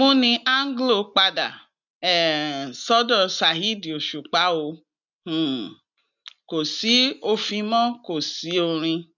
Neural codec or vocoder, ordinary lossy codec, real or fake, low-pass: none; none; real; 7.2 kHz